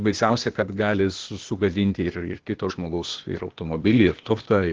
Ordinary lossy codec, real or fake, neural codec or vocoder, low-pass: Opus, 16 kbps; fake; codec, 16 kHz, 0.8 kbps, ZipCodec; 7.2 kHz